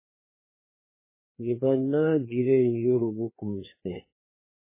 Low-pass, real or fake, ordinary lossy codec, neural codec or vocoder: 3.6 kHz; fake; MP3, 16 kbps; codec, 16 kHz, 2 kbps, FreqCodec, larger model